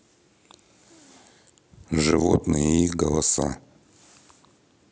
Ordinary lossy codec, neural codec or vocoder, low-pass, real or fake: none; none; none; real